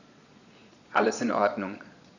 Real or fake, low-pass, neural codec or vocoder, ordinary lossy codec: fake; 7.2 kHz; vocoder, 22.05 kHz, 80 mel bands, Vocos; none